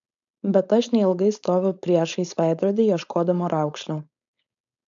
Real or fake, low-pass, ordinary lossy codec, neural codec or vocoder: fake; 7.2 kHz; AAC, 64 kbps; codec, 16 kHz, 4.8 kbps, FACodec